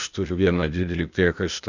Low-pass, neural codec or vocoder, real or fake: 7.2 kHz; codec, 16 kHz, 0.8 kbps, ZipCodec; fake